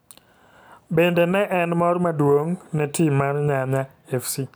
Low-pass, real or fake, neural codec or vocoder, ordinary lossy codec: none; real; none; none